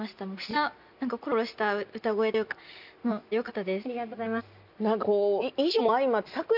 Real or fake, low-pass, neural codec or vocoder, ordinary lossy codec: real; 5.4 kHz; none; none